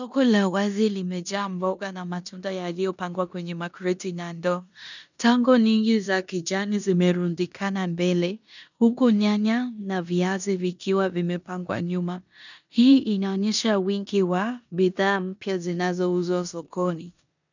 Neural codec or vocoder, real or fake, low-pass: codec, 16 kHz in and 24 kHz out, 0.9 kbps, LongCat-Audio-Codec, four codebook decoder; fake; 7.2 kHz